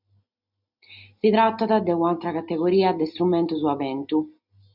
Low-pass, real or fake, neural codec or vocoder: 5.4 kHz; real; none